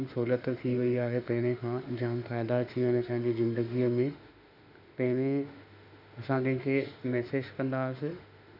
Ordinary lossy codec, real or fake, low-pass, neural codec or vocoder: none; fake; 5.4 kHz; autoencoder, 48 kHz, 32 numbers a frame, DAC-VAE, trained on Japanese speech